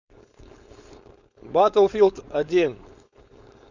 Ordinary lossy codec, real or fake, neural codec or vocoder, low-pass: Opus, 64 kbps; fake; codec, 16 kHz, 4.8 kbps, FACodec; 7.2 kHz